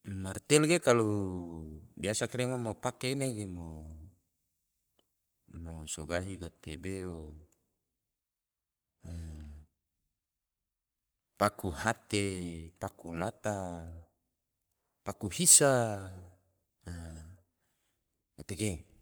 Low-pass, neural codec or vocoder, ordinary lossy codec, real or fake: none; codec, 44.1 kHz, 3.4 kbps, Pupu-Codec; none; fake